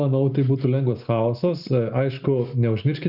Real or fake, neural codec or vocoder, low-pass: real; none; 5.4 kHz